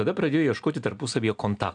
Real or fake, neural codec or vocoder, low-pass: real; none; 9.9 kHz